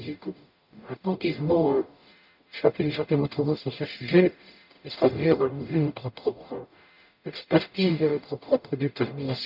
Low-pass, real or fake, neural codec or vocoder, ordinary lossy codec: 5.4 kHz; fake; codec, 44.1 kHz, 0.9 kbps, DAC; none